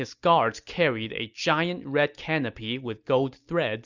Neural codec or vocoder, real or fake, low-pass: none; real; 7.2 kHz